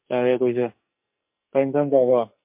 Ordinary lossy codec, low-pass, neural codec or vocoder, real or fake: MP3, 32 kbps; 3.6 kHz; codec, 44.1 kHz, 2.6 kbps, SNAC; fake